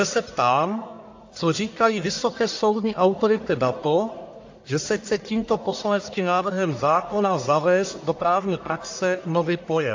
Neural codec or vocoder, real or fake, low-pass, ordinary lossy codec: codec, 44.1 kHz, 1.7 kbps, Pupu-Codec; fake; 7.2 kHz; AAC, 48 kbps